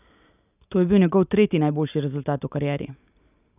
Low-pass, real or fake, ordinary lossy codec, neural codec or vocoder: 3.6 kHz; real; none; none